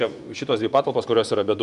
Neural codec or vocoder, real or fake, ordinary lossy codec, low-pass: vocoder, 24 kHz, 100 mel bands, Vocos; fake; Opus, 64 kbps; 10.8 kHz